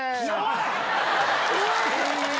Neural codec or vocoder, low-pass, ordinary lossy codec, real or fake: none; none; none; real